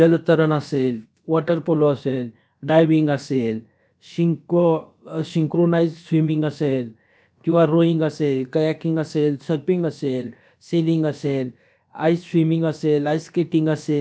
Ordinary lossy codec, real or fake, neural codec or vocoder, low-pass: none; fake; codec, 16 kHz, about 1 kbps, DyCAST, with the encoder's durations; none